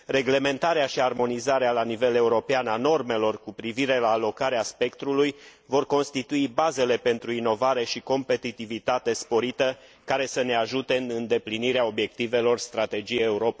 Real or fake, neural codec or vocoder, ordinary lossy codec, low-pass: real; none; none; none